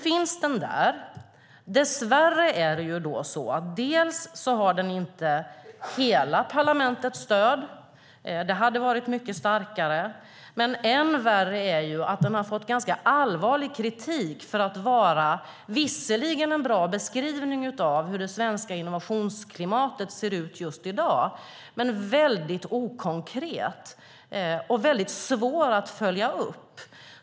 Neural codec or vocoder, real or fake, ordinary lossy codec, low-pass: none; real; none; none